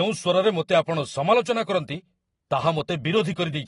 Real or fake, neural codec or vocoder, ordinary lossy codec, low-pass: real; none; AAC, 32 kbps; 10.8 kHz